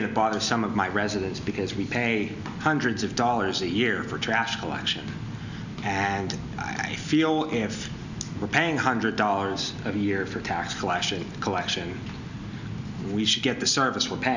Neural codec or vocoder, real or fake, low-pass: none; real; 7.2 kHz